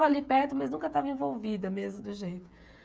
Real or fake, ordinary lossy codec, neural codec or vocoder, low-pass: fake; none; codec, 16 kHz, 8 kbps, FreqCodec, smaller model; none